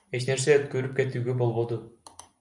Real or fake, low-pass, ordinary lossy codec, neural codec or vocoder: real; 10.8 kHz; MP3, 96 kbps; none